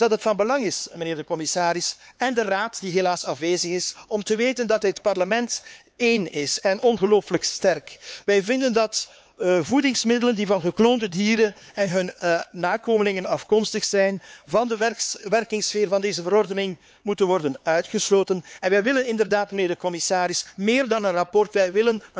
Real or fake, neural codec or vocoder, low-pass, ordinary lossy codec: fake; codec, 16 kHz, 4 kbps, X-Codec, HuBERT features, trained on LibriSpeech; none; none